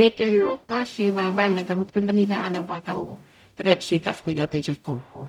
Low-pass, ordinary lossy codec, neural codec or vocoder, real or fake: 19.8 kHz; none; codec, 44.1 kHz, 0.9 kbps, DAC; fake